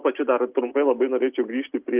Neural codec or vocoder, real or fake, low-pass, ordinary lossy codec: none; real; 3.6 kHz; Opus, 24 kbps